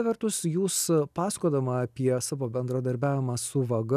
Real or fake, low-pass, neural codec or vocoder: real; 14.4 kHz; none